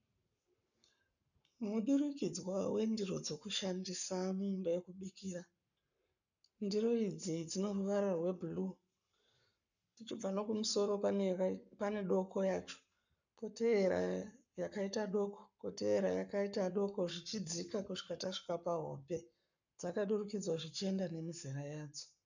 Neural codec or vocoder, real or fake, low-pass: codec, 44.1 kHz, 7.8 kbps, Pupu-Codec; fake; 7.2 kHz